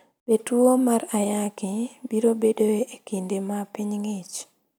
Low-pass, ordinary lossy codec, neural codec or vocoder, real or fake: none; none; none; real